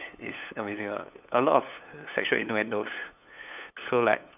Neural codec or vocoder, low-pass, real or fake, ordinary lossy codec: codec, 16 kHz, 8 kbps, FunCodec, trained on LibriTTS, 25 frames a second; 3.6 kHz; fake; none